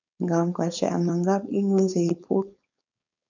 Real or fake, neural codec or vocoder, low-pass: fake; codec, 16 kHz, 4.8 kbps, FACodec; 7.2 kHz